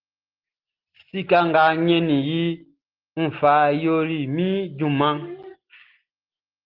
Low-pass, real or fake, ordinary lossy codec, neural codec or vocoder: 5.4 kHz; real; Opus, 16 kbps; none